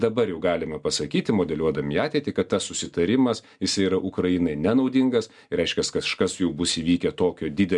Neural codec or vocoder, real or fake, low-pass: none; real; 10.8 kHz